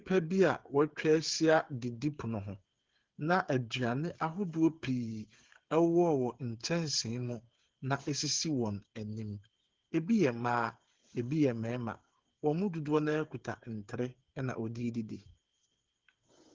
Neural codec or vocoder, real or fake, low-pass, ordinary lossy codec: codec, 16 kHz, 8 kbps, FreqCodec, smaller model; fake; 7.2 kHz; Opus, 16 kbps